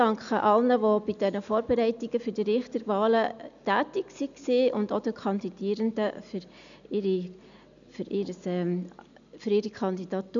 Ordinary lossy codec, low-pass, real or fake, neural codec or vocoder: none; 7.2 kHz; real; none